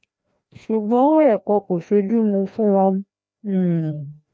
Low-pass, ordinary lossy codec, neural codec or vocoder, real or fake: none; none; codec, 16 kHz, 1 kbps, FreqCodec, larger model; fake